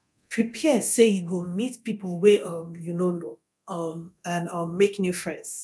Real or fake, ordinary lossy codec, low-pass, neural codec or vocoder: fake; none; none; codec, 24 kHz, 0.9 kbps, DualCodec